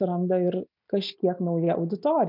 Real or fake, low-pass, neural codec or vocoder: real; 5.4 kHz; none